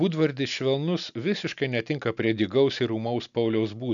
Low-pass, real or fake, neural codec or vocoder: 7.2 kHz; real; none